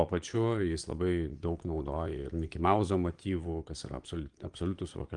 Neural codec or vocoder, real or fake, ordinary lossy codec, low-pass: vocoder, 22.05 kHz, 80 mel bands, Vocos; fake; Opus, 24 kbps; 9.9 kHz